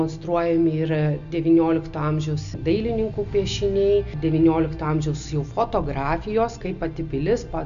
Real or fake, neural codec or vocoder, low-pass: real; none; 7.2 kHz